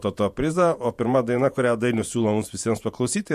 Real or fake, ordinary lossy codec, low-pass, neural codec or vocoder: fake; MP3, 64 kbps; 14.4 kHz; vocoder, 48 kHz, 128 mel bands, Vocos